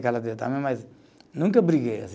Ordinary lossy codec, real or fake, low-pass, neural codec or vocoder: none; real; none; none